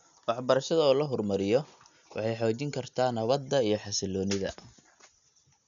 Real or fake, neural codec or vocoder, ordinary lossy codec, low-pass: real; none; none; 7.2 kHz